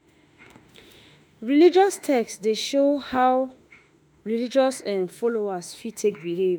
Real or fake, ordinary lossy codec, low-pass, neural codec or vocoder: fake; none; none; autoencoder, 48 kHz, 32 numbers a frame, DAC-VAE, trained on Japanese speech